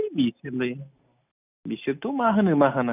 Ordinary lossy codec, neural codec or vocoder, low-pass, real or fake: none; none; 3.6 kHz; real